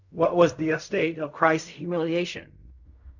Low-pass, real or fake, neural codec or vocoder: 7.2 kHz; fake; codec, 16 kHz in and 24 kHz out, 0.4 kbps, LongCat-Audio-Codec, fine tuned four codebook decoder